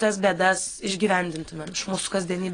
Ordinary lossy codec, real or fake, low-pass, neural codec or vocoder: AAC, 32 kbps; real; 9.9 kHz; none